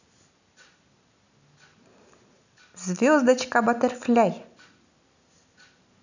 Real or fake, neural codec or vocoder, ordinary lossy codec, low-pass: real; none; none; 7.2 kHz